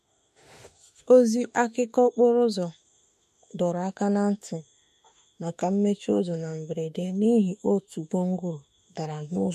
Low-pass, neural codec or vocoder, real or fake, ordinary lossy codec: 14.4 kHz; autoencoder, 48 kHz, 32 numbers a frame, DAC-VAE, trained on Japanese speech; fake; MP3, 64 kbps